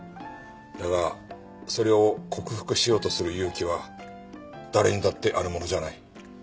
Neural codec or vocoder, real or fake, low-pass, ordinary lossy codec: none; real; none; none